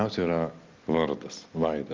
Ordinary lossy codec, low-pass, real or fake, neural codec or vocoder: Opus, 24 kbps; 7.2 kHz; real; none